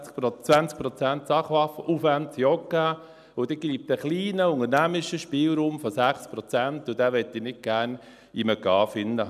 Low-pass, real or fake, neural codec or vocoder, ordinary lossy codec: 14.4 kHz; real; none; none